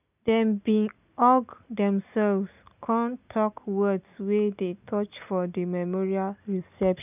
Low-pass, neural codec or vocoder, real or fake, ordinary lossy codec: 3.6 kHz; none; real; none